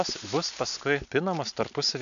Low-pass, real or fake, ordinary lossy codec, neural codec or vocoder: 7.2 kHz; real; MP3, 96 kbps; none